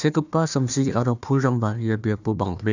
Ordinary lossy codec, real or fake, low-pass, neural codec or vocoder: none; fake; 7.2 kHz; codec, 16 kHz, 1 kbps, FunCodec, trained on Chinese and English, 50 frames a second